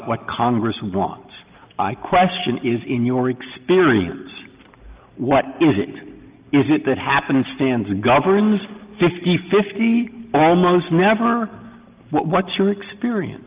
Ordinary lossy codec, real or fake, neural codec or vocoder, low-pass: Opus, 24 kbps; real; none; 3.6 kHz